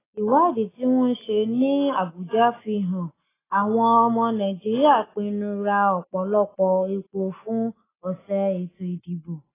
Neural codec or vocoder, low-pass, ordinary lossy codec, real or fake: none; 3.6 kHz; AAC, 16 kbps; real